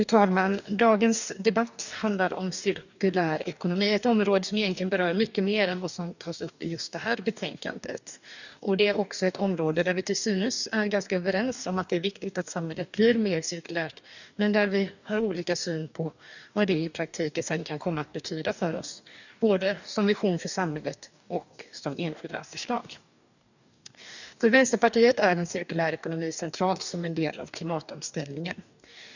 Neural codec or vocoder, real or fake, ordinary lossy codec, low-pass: codec, 44.1 kHz, 2.6 kbps, DAC; fake; none; 7.2 kHz